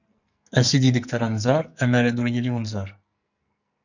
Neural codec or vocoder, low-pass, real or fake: codec, 44.1 kHz, 2.6 kbps, SNAC; 7.2 kHz; fake